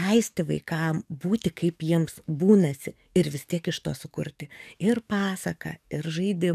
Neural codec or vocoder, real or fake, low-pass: codec, 44.1 kHz, 7.8 kbps, DAC; fake; 14.4 kHz